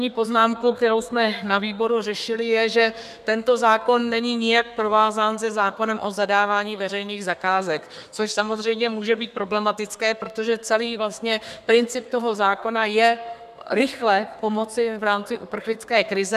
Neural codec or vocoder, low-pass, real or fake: codec, 32 kHz, 1.9 kbps, SNAC; 14.4 kHz; fake